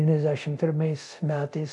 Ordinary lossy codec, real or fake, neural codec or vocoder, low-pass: AAC, 64 kbps; fake; codec, 24 kHz, 0.9 kbps, DualCodec; 10.8 kHz